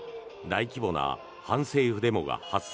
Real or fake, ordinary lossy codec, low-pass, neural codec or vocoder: real; none; none; none